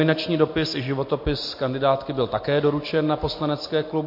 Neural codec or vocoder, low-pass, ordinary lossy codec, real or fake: none; 5.4 kHz; MP3, 32 kbps; real